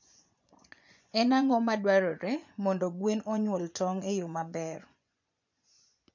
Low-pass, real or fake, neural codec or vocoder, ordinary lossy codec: 7.2 kHz; fake; vocoder, 24 kHz, 100 mel bands, Vocos; none